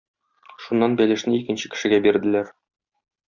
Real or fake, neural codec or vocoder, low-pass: real; none; 7.2 kHz